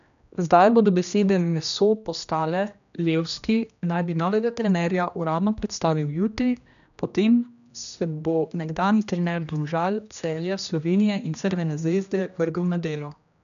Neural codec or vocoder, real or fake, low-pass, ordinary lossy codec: codec, 16 kHz, 1 kbps, X-Codec, HuBERT features, trained on general audio; fake; 7.2 kHz; none